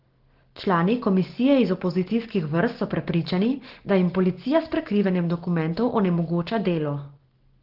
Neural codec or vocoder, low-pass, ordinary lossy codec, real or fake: none; 5.4 kHz; Opus, 16 kbps; real